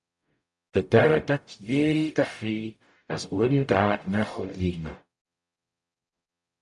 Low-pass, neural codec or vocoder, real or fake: 10.8 kHz; codec, 44.1 kHz, 0.9 kbps, DAC; fake